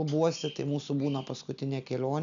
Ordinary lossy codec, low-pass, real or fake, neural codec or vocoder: MP3, 96 kbps; 7.2 kHz; real; none